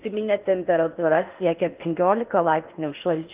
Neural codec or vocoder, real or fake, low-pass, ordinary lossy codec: codec, 16 kHz in and 24 kHz out, 0.6 kbps, FocalCodec, streaming, 4096 codes; fake; 3.6 kHz; Opus, 32 kbps